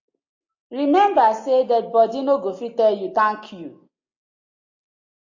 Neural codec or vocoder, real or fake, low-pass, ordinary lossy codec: none; real; 7.2 kHz; AAC, 48 kbps